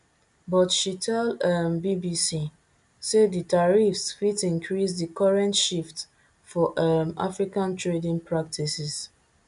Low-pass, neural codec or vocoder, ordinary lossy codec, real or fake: 10.8 kHz; none; none; real